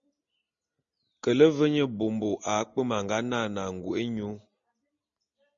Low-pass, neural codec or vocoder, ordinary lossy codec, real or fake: 7.2 kHz; none; MP3, 48 kbps; real